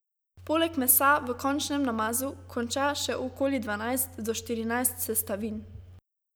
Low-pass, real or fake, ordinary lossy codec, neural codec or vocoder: none; real; none; none